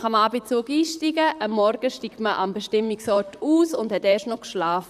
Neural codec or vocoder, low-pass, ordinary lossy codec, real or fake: vocoder, 44.1 kHz, 128 mel bands, Pupu-Vocoder; 14.4 kHz; none; fake